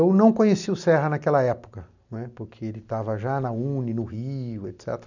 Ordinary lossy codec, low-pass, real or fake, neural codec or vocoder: none; 7.2 kHz; real; none